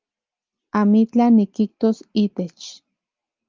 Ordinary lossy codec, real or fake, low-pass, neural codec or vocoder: Opus, 32 kbps; real; 7.2 kHz; none